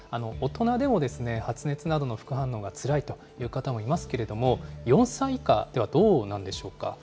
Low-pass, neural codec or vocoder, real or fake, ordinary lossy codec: none; none; real; none